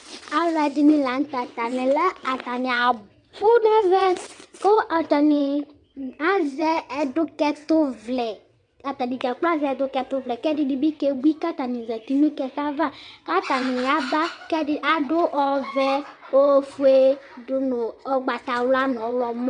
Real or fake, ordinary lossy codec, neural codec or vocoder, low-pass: fake; MP3, 96 kbps; vocoder, 22.05 kHz, 80 mel bands, WaveNeXt; 9.9 kHz